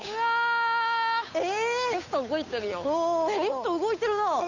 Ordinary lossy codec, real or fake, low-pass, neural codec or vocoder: none; fake; 7.2 kHz; codec, 16 kHz, 8 kbps, FunCodec, trained on Chinese and English, 25 frames a second